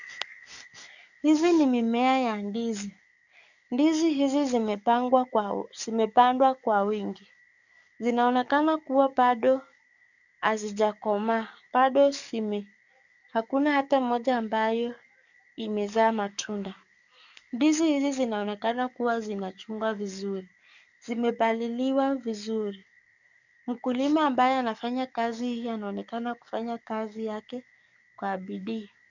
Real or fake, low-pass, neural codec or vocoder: fake; 7.2 kHz; codec, 44.1 kHz, 7.8 kbps, DAC